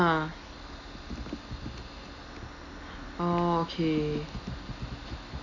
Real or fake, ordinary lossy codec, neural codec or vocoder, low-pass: real; none; none; 7.2 kHz